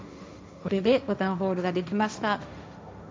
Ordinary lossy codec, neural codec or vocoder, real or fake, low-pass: none; codec, 16 kHz, 1.1 kbps, Voila-Tokenizer; fake; none